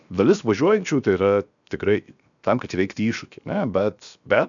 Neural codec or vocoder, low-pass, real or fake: codec, 16 kHz, 0.7 kbps, FocalCodec; 7.2 kHz; fake